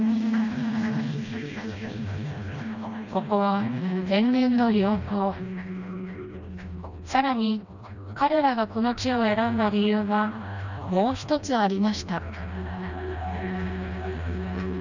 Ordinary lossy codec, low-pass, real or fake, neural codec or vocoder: none; 7.2 kHz; fake; codec, 16 kHz, 1 kbps, FreqCodec, smaller model